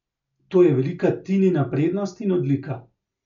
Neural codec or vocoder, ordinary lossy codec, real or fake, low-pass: none; none; real; 7.2 kHz